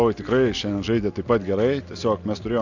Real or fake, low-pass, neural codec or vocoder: real; 7.2 kHz; none